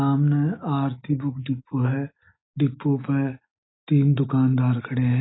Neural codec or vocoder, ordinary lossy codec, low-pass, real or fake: none; AAC, 16 kbps; 7.2 kHz; real